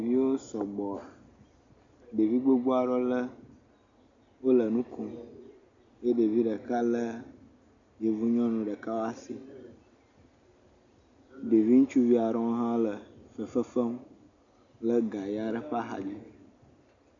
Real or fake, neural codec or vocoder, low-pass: real; none; 7.2 kHz